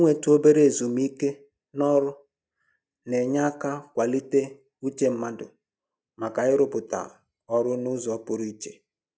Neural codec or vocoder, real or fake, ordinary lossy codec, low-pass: none; real; none; none